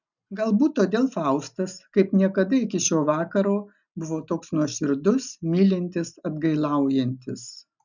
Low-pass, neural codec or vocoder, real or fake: 7.2 kHz; none; real